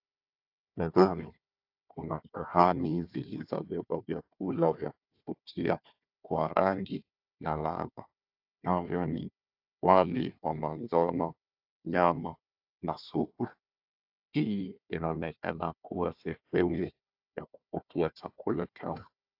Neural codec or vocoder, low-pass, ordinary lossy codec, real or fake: codec, 16 kHz, 1 kbps, FunCodec, trained on Chinese and English, 50 frames a second; 5.4 kHz; AAC, 48 kbps; fake